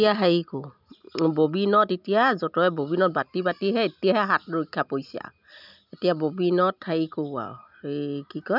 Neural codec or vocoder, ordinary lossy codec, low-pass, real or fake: none; none; 5.4 kHz; real